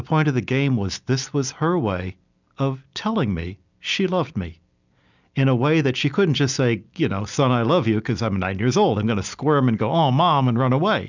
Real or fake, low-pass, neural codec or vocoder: real; 7.2 kHz; none